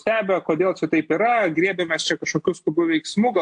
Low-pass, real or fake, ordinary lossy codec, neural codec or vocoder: 9.9 kHz; real; AAC, 64 kbps; none